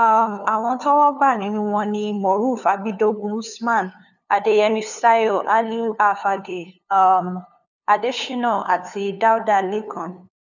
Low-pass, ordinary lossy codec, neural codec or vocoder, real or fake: 7.2 kHz; none; codec, 16 kHz, 2 kbps, FunCodec, trained on LibriTTS, 25 frames a second; fake